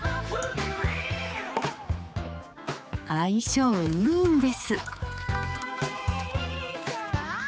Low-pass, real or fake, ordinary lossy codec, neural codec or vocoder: none; fake; none; codec, 16 kHz, 4 kbps, X-Codec, HuBERT features, trained on general audio